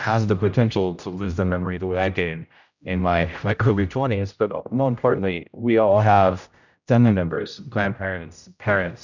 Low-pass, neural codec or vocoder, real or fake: 7.2 kHz; codec, 16 kHz, 0.5 kbps, X-Codec, HuBERT features, trained on general audio; fake